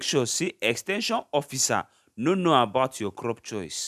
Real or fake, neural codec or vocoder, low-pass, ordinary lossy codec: real; none; 14.4 kHz; none